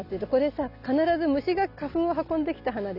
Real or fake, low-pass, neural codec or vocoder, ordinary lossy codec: real; 5.4 kHz; none; none